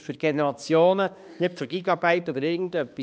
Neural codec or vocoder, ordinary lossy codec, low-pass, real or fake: codec, 16 kHz, 2 kbps, X-Codec, HuBERT features, trained on balanced general audio; none; none; fake